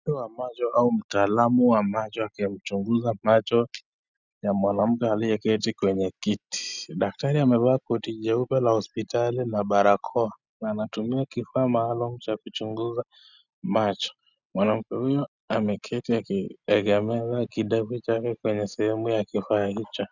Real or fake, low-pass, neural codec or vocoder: real; 7.2 kHz; none